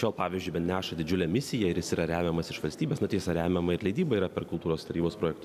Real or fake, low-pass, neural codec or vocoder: real; 14.4 kHz; none